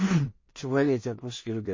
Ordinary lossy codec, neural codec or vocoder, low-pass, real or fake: MP3, 32 kbps; codec, 16 kHz in and 24 kHz out, 0.4 kbps, LongCat-Audio-Codec, two codebook decoder; 7.2 kHz; fake